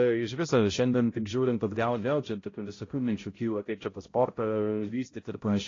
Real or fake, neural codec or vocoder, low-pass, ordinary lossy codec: fake; codec, 16 kHz, 0.5 kbps, X-Codec, HuBERT features, trained on balanced general audio; 7.2 kHz; AAC, 32 kbps